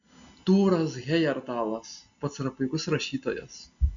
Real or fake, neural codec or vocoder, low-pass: real; none; 7.2 kHz